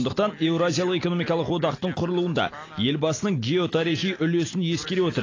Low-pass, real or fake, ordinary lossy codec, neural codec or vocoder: 7.2 kHz; real; AAC, 32 kbps; none